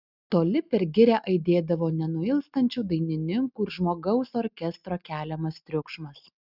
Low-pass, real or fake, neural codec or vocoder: 5.4 kHz; real; none